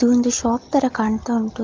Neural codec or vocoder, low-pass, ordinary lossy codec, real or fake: none; 7.2 kHz; Opus, 32 kbps; real